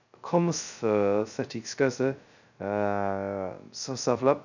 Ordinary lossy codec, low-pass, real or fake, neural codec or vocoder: none; 7.2 kHz; fake; codec, 16 kHz, 0.2 kbps, FocalCodec